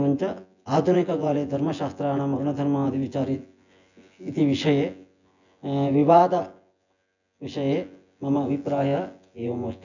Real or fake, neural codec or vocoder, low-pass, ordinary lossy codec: fake; vocoder, 24 kHz, 100 mel bands, Vocos; 7.2 kHz; none